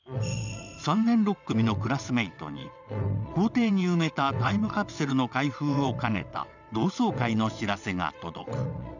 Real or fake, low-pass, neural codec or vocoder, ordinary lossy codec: fake; 7.2 kHz; vocoder, 22.05 kHz, 80 mel bands, WaveNeXt; none